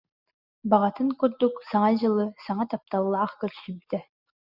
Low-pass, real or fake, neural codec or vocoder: 5.4 kHz; real; none